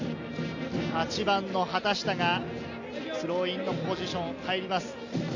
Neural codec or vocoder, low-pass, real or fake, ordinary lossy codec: none; 7.2 kHz; real; MP3, 64 kbps